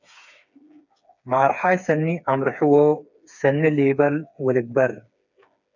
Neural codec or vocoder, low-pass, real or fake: codec, 16 kHz, 4 kbps, FreqCodec, smaller model; 7.2 kHz; fake